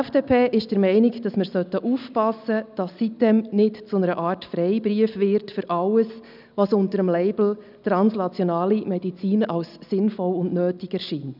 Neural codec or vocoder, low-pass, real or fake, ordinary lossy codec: none; 5.4 kHz; real; none